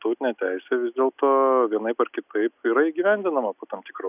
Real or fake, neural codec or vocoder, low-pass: real; none; 3.6 kHz